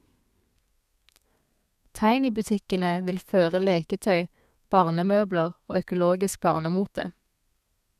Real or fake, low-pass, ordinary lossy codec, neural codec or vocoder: fake; 14.4 kHz; none; codec, 32 kHz, 1.9 kbps, SNAC